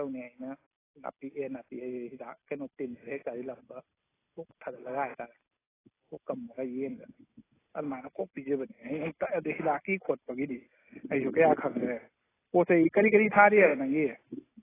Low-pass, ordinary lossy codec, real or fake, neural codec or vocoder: 3.6 kHz; AAC, 16 kbps; real; none